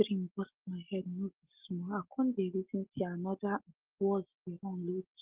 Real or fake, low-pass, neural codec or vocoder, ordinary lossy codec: real; 3.6 kHz; none; Opus, 16 kbps